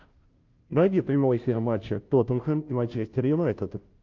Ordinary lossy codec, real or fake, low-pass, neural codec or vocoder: Opus, 24 kbps; fake; 7.2 kHz; codec, 16 kHz, 0.5 kbps, FunCodec, trained on Chinese and English, 25 frames a second